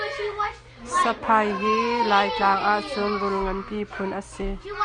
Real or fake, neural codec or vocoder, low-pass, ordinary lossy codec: real; none; 9.9 kHz; Opus, 64 kbps